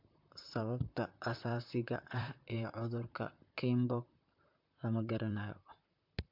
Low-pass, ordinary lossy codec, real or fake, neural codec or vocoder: 5.4 kHz; MP3, 48 kbps; fake; vocoder, 44.1 kHz, 128 mel bands, Pupu-Vocoder